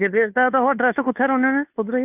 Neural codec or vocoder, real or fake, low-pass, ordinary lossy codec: codec, 16 kHz, 2 kbps, FunCodec, trained on Chinese and English, 25 frames a second; fake; 3.6 kHz; none